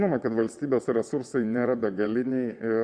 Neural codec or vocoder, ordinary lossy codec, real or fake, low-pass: vocoder, 22.05 kHz, 80 mel bands, WaveNeXt; Opus, 64 kbps; fake; 9.9 kHz